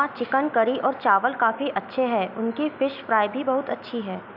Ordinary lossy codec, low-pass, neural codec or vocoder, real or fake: none; 5.4 kHz; none; real